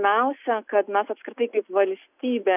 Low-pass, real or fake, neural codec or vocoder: 3.6 kHz; real; none